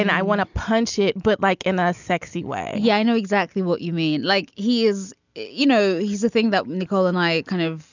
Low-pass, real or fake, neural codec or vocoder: 7.2 kHz; real; none